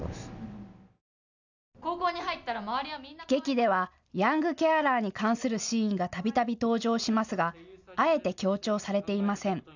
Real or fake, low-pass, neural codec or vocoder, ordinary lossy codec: real; 7.2 kHz; none; none